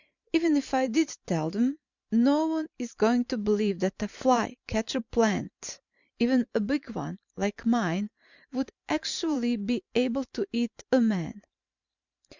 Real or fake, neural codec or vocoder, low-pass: fake; vocoder, 44.1 kHz, 128 mel bands every 512 samples, BigVGAN v2; 7.2 kHz